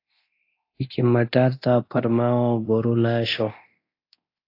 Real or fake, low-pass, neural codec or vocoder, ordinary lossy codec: fake; 5.4 kHz; codec, 24 kHz, 0.9 kbps, DualCodec; AAC, 32 kbps